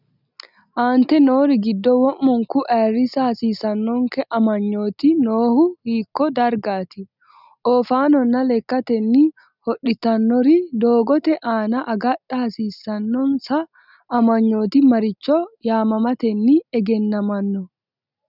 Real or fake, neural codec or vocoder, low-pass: real; none; 5.4 kHz